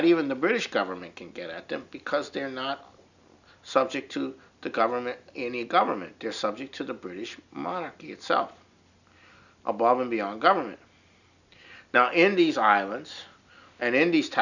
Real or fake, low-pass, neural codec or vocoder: real; 7.2 kHz; none